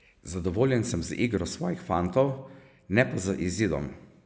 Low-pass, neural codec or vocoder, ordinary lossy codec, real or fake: none; none; none; real